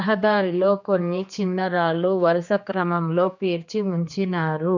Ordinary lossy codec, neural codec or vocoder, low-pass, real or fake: none; codec, 16 kHz, 2 kbps, X-Codec, HuBERT features, trained on general audio; 7.2 kHz; fake